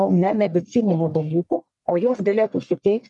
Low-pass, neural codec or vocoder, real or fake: 10.8 kHz; codec, 44.1 kHz, 1.7 kbps, Pupu-Codec; fake